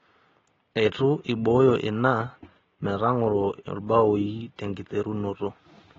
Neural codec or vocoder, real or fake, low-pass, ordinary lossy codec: none; real; 7.2 kHz; AAC, 24 kbps